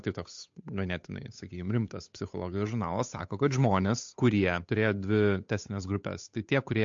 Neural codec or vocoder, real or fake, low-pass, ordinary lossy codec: codec, 16 kHz, 8 kbps, FunCodec, trained on Chinese and English, 25 frames a second; fake; 7.2 kHz; MP3, 48 kbps